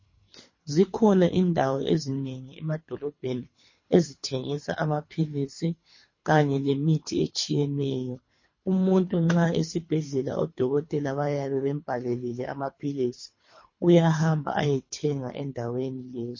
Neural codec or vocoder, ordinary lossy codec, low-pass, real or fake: codec, 24 kHz, 3 kbps, HILCodec; MP3, 32 kbps; 7.2 kHz; fake